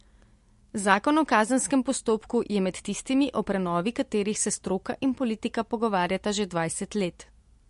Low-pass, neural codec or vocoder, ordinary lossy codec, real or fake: 10.8 kHz; none; MP3, 48 kbps; real